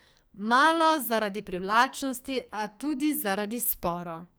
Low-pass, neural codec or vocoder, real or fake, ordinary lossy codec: none; codec, 44.1 kHz, 2.6 kbps, SNAC; fake; none